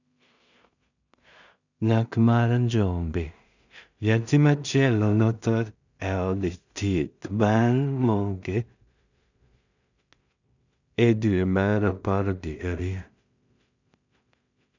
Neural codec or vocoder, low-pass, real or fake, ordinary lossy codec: codec, 16 kHz in and 24 kHz out, 0.4 kbps, LongCat-Audio-Codec, two codebook decoder; 7.2 kHz; fake; none